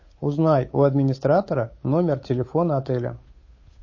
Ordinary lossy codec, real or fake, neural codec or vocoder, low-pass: MP3, 32 kbps; fake; codec, 16 kHz, 8 kbps, FunCodec, trained on Chinese and English, 25 frames a second; 7.2 kHz